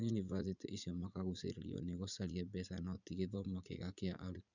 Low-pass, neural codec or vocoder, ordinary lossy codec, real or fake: 7.2 kHz; vocoder, 44.1 kHz, 80 mel bands, Vocos; none; fake